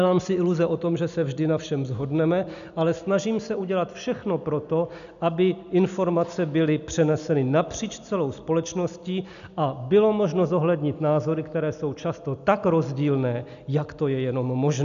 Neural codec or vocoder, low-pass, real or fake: none; 7.2 kHz; real